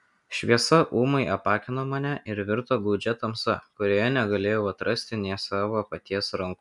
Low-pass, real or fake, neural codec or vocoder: 10.8 kHz; real; none